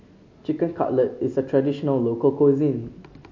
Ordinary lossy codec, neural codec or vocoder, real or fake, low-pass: MP3, 48 kbps; none; real; 7.2 kHz